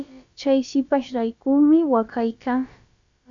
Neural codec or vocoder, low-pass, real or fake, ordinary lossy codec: codec, 16 kHz, about 1 kbps, DyCAST, with the encoder's durations; 7.2 kHz; fake; AAC, 64 kbps